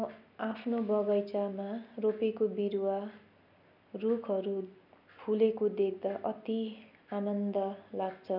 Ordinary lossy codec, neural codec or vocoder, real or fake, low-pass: none; none; real; 5.4 kHz